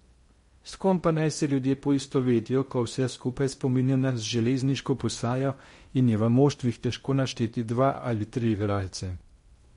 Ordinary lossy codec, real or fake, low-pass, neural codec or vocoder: MP3, 48 kbps; fake; 10.8 kHz; codec, 16 kHz in and 24 kHz out, 0.6 kbps, FocalCodec, streaming, 2048 codes